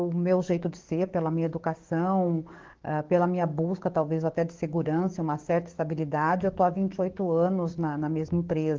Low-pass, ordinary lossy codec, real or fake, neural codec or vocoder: 7.2 kHz; Opus, 16 kbps; fake; codec, 44.1 kHz, 7.8 kbps, DAC